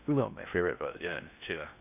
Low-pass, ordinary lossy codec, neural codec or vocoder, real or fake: 3.6 kHz; none; codec, 16 kHz in and 24 kHz out, 0.6 kbps, FocalCodec, streaming, 2048 codes; fake